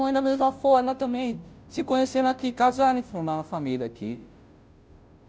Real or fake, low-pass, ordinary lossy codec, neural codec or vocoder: fake; none; none; codec, 16 kHz, 0.5 kbps, FunCodec, trained on Chinese and English, 25 frames a second